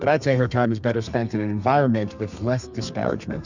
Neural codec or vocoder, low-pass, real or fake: codec, 32 kHz, 1.9 kbps, SNAC; 7.2 kHz; fake